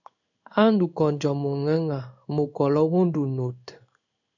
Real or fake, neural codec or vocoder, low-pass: fake; codec, 16 kHz in and 24 kHz out, 1 kbps, XY-Tokenizer; 7.2 kHz